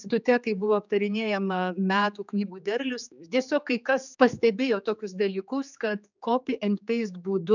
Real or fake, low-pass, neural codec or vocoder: fake; 7.2 kHz; codec, 16 kHz, 4 kbps, X-Codec, HuBERT features, trained on general audio